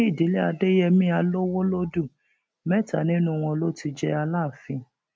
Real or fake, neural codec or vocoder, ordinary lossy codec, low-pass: real; none; none; none